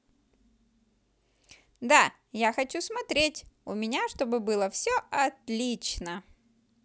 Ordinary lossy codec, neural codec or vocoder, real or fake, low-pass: none; none; real; none